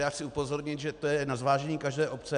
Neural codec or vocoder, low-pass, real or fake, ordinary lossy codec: none; 9.9 kHz; real; MP3, 64 kbps